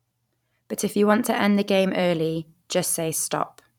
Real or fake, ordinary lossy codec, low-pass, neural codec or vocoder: fake; none; 19.8 kHz; vocoder, 44.1 kHz, 128 mel bands every 512 samples, BigVGAN v2